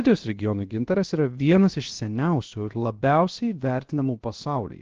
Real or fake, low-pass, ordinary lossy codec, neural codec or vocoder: fake; 7.2 kHz; Opus, 16 kbps; codec, 16 kHz, about 1 kbps, DyCAST, with the encoder's durations